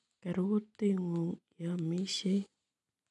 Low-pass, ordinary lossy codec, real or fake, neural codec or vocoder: 10.8 kHz; none; real; none